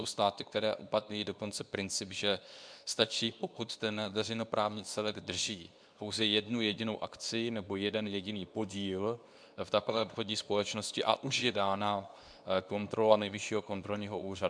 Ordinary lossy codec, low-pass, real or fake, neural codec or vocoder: MP3, 96 kbps; 9.9 kHz; fake; codec, 24 kHz, 0.9 kbps, WavTokenizer, medium speech release version 2